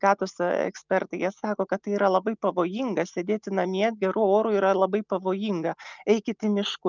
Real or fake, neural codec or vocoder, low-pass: real; none; 7.2 kHz